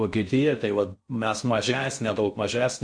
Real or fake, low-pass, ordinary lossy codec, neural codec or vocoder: fake; 9.9 kHz; MP3, 64 kbps; codec, 16 kHz in and 24 kHz out, 0.6 kbps, FocalCodec, streaming, 4096 codes